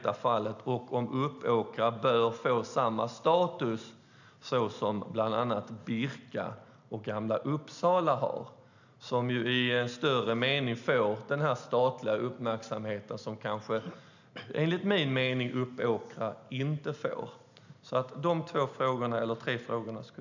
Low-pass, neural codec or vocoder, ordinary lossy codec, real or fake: 7.2 kHz; none; none; real